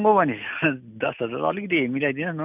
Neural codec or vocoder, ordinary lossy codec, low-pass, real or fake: none; none; 3.6 kHz; real